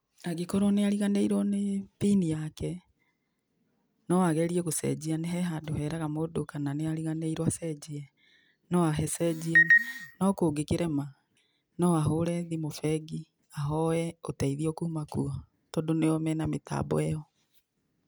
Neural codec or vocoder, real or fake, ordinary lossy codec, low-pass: none; real; none; none